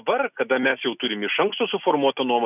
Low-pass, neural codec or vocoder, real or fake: 3.6 kHz; none; real